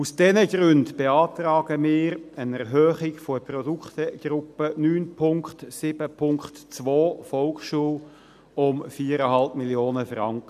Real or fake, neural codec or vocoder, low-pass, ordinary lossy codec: real; none; 14.4 kHz; none